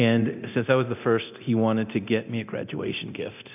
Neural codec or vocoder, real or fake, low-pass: codec, 24 kHz, 0.9 kbps, DualCodec; fake; 3.6 kHz